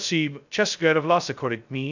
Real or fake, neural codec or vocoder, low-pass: fake; codec, 16 kHz, 0.2 kbps, FocalCodec; 7.2 kHz